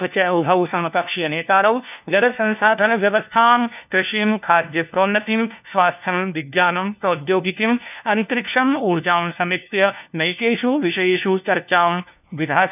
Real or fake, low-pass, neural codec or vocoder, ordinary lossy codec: fake; 3.6 kHz; codec, 16 kHz, 1 kbps, FunCodec, trained on LibriTTS, 50 frames a second; none